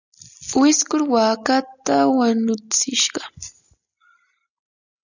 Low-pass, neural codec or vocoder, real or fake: 7.2 kHz; none; real